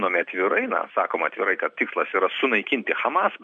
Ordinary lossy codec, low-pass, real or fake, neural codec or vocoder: MP3, 96 kbps; 9.9 kHz; real; none